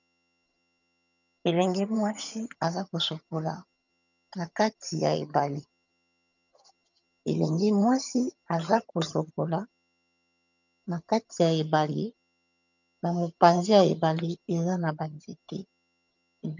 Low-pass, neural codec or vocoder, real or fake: 7.2 kHz; vocoder, 22.05 kHz, 80 mel bands, HiFi-GAN; fake